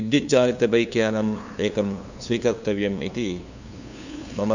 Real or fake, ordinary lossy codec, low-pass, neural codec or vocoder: fake; none; 7.2 kHz; codec, 16 kHz, 2 kbps, FunCodec, trained on LibriTTS, 25 frames a second